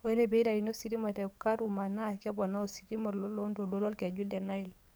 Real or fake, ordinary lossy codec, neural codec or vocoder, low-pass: fake; none; codec, 44.1 kHz, 7.8 kbps, DAC; none